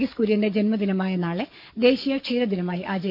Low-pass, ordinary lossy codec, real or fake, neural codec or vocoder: 5.4 kHz; none; fake; codec, 44.1 kHz, 7.8 kbps, DAC